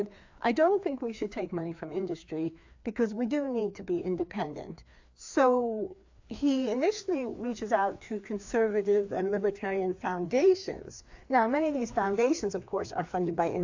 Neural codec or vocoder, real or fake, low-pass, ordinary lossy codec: codec, 16 kHz, 2 kbps, FreqCodec, larger model; fake; 7.2 kHz; AAC, 48 kbps